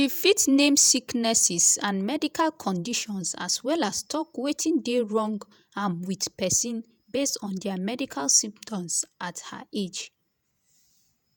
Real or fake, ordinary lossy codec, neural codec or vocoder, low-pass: fake; none; vocoder, 48 kHz, 128 mel bands, Vocos; none